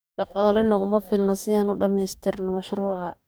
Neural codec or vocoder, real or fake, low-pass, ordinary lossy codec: codec, 44.1 kHz, 2.6 kbps, DAC; fake; none; none